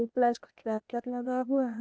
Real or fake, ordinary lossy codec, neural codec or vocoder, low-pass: fake; none; codec, 16 kHz, 0.8 kbps, ZipCodec; none